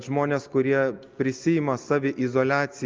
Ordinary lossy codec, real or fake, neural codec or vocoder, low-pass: Opus, 24 kbps; real; none; 7.2 kHz